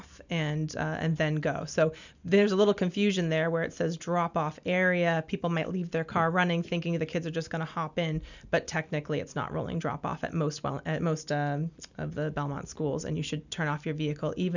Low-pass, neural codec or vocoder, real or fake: 7.2 kHz; none; real